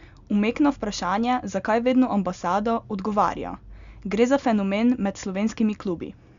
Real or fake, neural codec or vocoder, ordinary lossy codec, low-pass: real; none; Opus, 64 kbps; 7.2 kHz